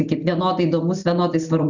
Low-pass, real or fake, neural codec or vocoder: 7.2 kHz; real; none